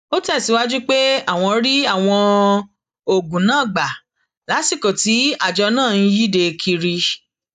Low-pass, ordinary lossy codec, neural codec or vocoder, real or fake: 14.4 kHz; none; none; real